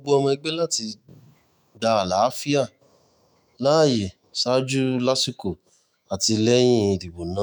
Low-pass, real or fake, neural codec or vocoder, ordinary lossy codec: none; fake; autoencoder, 48 kHz, 128 numbers a frame, DAC-VAE, trained on Japanese speech; none